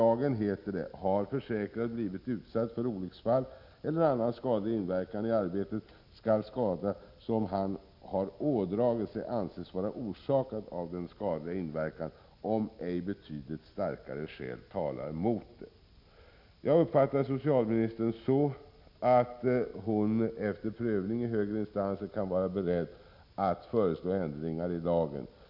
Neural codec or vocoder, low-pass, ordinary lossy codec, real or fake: none; 5.4 kHz; none; real